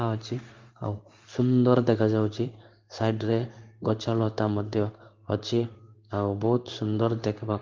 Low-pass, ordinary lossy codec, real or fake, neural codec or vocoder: 7.2 kHz; Opus, 24 kbps; fake; codec, 16 kHz in and 24 kHz out, 1 kbps, XY-Tokenizer